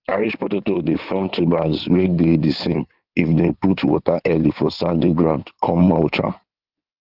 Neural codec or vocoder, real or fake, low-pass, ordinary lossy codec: codec, 16 kHz in and 24 kHz out, 2.2 kbps, FireRedTTS-2 codec; fake; 5.4 kHz; Opus, 32 kbps